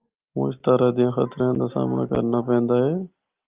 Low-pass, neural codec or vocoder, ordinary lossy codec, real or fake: 3.6 kHz; none; Opus, 32 kbps; real